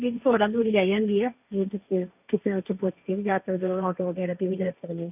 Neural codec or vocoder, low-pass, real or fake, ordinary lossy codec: codec, 16 kHz, 1.1 kbps, Voila-Tokenizer; 3.6 kHz; fake; none